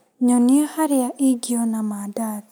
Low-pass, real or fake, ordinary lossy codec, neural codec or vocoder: none; real; none; none